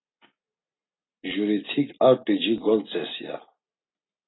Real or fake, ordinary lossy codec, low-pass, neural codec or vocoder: real; AAC, 16 kbps; 7.2 kHz; none